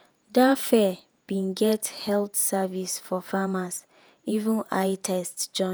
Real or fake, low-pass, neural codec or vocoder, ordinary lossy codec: fake; none; vocoder, 48 kHz, 128 mel bands, Vocos; none